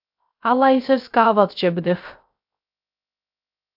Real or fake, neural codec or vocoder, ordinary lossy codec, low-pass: fake; codec, 16 kHz, 0.3 kbps, FocalCodec; AAC, 48 kbps; 5.4 kHz